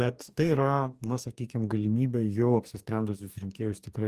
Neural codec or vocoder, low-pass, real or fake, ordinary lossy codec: codec, 44.1 kHz, 2.6 kbps, DAC; 14.4 kHz; fake; Opus, 32 kbps